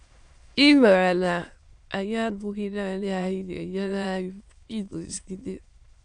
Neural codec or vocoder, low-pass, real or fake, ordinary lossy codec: autoencoder, 22.05 kHz, a latent of 192 numbers a frame, VITS, trained on many speakers; 9.9 kHz; fake; none